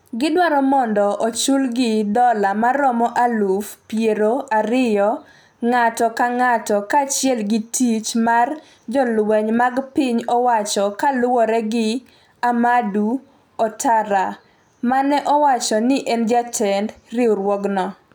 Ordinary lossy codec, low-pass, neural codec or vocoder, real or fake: none; none; none; real